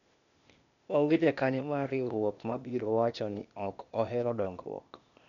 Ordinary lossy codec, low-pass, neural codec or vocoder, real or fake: none; 7.2 kHz; codec, 16 kHz, 0.8 kbps, ZipCodec; fake